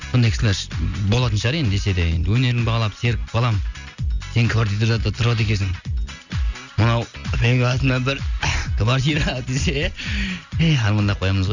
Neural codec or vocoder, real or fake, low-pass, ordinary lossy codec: none; real; 7.2 kHz; none